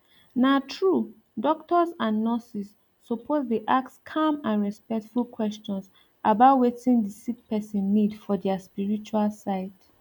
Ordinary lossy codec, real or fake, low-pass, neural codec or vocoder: none; real; 19.8 kHz; none